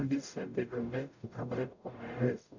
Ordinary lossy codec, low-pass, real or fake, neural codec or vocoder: none; 7.2 kHz; fake; codec, 44.1 kHz, 0.9 kbps, DAC